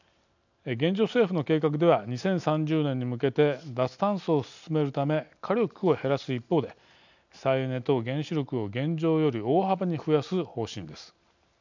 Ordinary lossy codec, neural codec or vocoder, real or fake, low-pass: none; none; real; 7.2 kHz